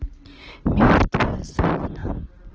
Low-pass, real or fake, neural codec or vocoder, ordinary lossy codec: 7.2 kHz; real; none; Opus, 16 kbps